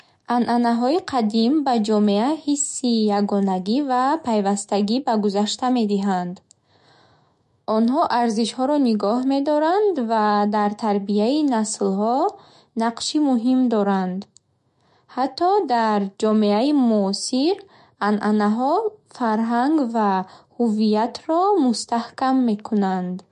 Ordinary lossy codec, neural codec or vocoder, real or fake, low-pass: MP3, 48 kbps; autoencoder, 48 kHz, 128 numbers a frame, DAC-VAE, trained on Japanese speech; fake; 14.4 kHz